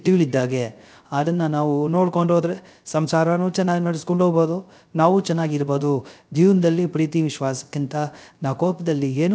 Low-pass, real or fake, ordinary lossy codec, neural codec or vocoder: none; fake; none; codec, 16 kHz, 0.3 kbps, FocalCodec